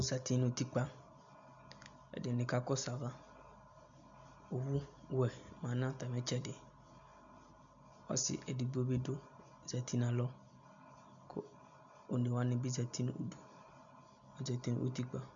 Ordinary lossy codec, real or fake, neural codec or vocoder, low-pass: MP3, 64 kbps; real; none; 7.2 kHz